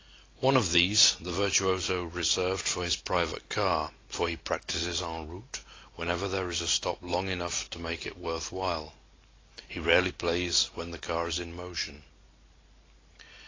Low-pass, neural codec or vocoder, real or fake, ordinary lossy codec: 7.2 kHz; none; real; AAC, 32 kbps